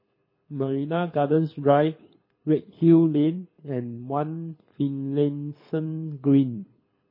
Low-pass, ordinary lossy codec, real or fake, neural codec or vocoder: 5.4 kHz; MP3, 24 kbps; fake; codec, 24 kHz, 6 kbps, HILCodec